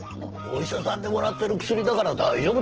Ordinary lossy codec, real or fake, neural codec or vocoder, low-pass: Opus, 16 kbps; real; none; 7.2 kHz